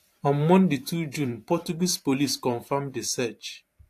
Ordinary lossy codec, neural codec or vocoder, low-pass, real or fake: AAC, 64 kbps; vocoder, 44.1 kHz, 128 mel bands every 512 samples, BigVGAN v2; 14.4 kHz; fake